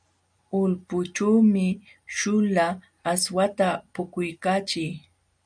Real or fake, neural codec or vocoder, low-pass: real; none; 9.9 kHz